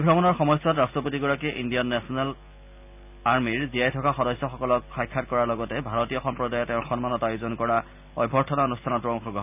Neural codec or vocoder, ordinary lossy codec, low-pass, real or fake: none; none; 3.6 kHz; real